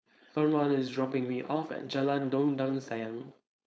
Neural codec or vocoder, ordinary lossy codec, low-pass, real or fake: codec, 16 kHz, 4.8 kbps, FACodec; none; none; fake